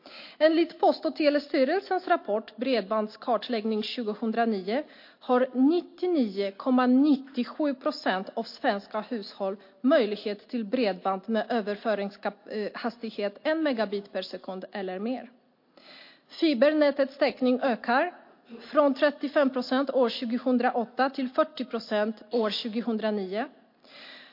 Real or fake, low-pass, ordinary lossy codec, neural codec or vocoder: real; 5.4 kHz; MP3, 32 kbps; none